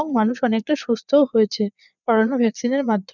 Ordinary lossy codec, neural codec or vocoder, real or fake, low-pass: none; vocoder, 22.05 kHz, 80 mel bands, Vocos; fake; 7.2 kHz